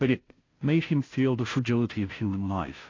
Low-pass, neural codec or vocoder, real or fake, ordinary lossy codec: 7.2 kHz; codec, 16 kHz, 0.5 kbps, FunCodec, trained on Chinese and English, 25 frames a second; fake; AAC, 32 kbps